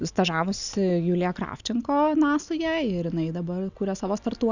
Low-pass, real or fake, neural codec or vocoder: 7.2 kHz; real; none